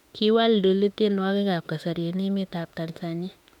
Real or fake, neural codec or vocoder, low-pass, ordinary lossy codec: fake; autoencoder, 48 kHz, 32 numbers a frame, DAC-VAE, trained on Japanese speech; 19.8 kHz; none